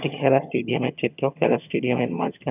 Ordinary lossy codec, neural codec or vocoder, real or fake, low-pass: none; vocoder, 22.05 kHz, 80 mel bands, HiFi-GAN; fake; 3.6 kHz